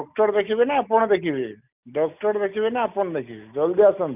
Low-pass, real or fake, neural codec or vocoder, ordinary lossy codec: 3.6 kHz; real; none; none